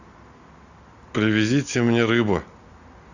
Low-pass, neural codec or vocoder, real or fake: 7.2 kHz; none; real